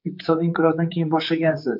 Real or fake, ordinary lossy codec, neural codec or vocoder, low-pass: fake; MP3, 48 kbps; codec, 16 kHz, 4 kbps, X-Codec, HuBERT features, trained on general audio; 5.4 kHz